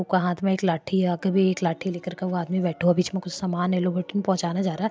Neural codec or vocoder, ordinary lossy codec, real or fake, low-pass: none; none; real; none